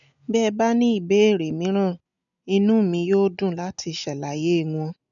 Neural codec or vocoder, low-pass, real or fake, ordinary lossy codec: none; 7.2 kHz; real; none